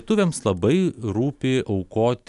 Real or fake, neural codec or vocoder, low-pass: real; none; 10.8 kHz